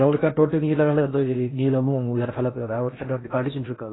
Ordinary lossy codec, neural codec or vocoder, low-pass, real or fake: AAC, 16 kbps; codec, 16 kHz in and 24 kHz out, 0.6 kbps, FocalCodec, streaming, 4096 codes; 7.2 kHz; fake